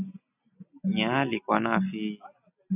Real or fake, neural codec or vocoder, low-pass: real; none; 3.6 kHz